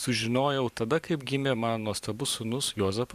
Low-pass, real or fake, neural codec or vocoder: 14.4 kHz; fake; vocoder, 44.1 kHz, 128 mel bands every 512 samples, BigVGAN v2